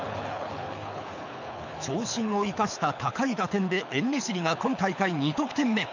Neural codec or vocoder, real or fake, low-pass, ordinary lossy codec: codec, 24 kHz, 6 kbps, HILCodec; fake; 7.2 kHz; none